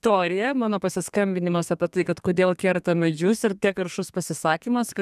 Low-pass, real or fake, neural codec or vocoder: 14.4 kHz; fake; codec, 32 kHz, 1.9 kbps, SNAC